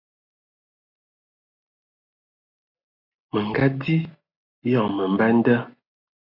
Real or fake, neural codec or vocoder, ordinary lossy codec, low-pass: real; none; AAC, 32 kbps; 5.4 kHz